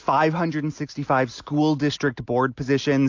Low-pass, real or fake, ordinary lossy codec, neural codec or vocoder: 7.2 kHz; real; AAC, 48 kbps; none